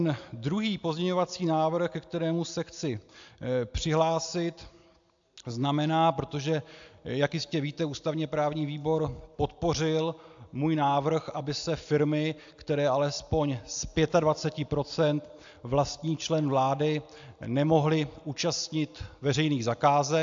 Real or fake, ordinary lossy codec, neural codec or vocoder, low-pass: real; AAC, 64 kbps; none; 7.2 kHz